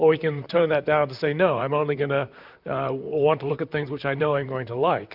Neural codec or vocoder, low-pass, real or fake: vocoder, 44.1 kHz, 128 mel bands, Pupu-Vocoder; 5.4 kHz; fake